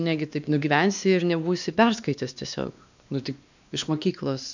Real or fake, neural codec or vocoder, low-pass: fake; codec, 16 kHz, 2 kbps, X-Codec, WavLM features, trained on Multilingual LibriSpeech; 7.2 kHz